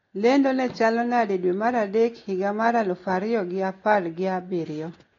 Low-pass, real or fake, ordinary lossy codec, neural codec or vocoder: 7.2 kHz; real; AAC, 32 kbps; none